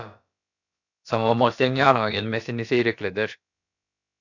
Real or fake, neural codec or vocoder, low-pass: fake; codec, 16 kHz, about 1 kbps, DyCAST, with the encoder's durations; 7.2 kHz